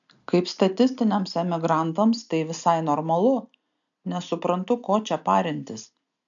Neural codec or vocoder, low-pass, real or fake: none; 7.2 kHz; real